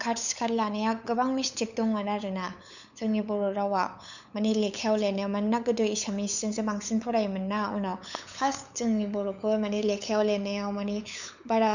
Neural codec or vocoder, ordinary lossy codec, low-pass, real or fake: codec, 16 kHz, 8 kbps, FunCodec, trained on LibriTTS, 25 frames a second; none; 7.2 kHz; fake